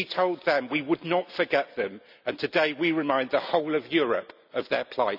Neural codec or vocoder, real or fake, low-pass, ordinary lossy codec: none; real; 5.4 kHz; none